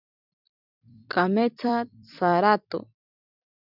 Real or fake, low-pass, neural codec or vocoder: fake; 5.4 kHz; vocoder, 44.1 kHz, 128 mel bands every 256 samples, BigVGAN v2